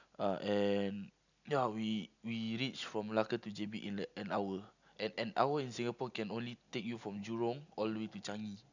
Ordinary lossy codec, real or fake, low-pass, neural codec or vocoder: none; real; 7.2 kHz; none